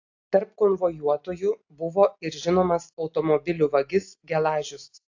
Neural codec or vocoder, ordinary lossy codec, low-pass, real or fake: none; AAC, 48 kbps; 7.2 kHz; real